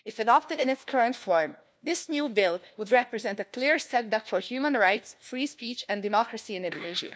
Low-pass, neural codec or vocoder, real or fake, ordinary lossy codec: none; codec, 16 kHz, 1 kbps, FunCodec, trained on LibriTTS, 50 frames a second; fake; none